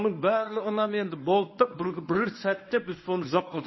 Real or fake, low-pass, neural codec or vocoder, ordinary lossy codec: fake; 7.2 kHz; codec, 24 kHz, 0.9 kbps, WavTokenizer, medium speech release version 1; MP3, 24 kbps